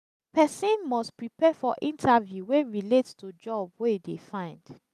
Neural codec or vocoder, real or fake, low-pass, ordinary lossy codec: none; real; 14.4 kHz; none